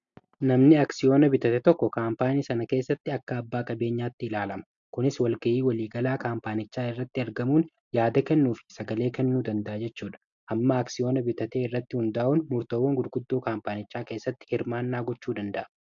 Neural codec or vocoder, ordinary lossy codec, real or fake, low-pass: none; AAC, 64 kbps; real; 7.2 kHz